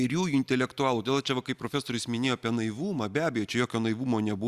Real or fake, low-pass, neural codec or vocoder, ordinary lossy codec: real; 14.4 kHz; none; Opus, 64 kbps